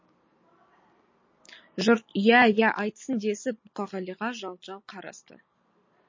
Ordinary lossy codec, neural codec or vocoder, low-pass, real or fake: MP3, 32 kbps; none; 7.2 kHz; real